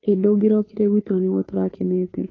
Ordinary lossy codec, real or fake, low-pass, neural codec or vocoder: AAC, 32 kbps; fake; 7.2 kHz; codec, 16 kHz, 2 kbps, FunCodec, trained on Chinese and English, 25 frames a second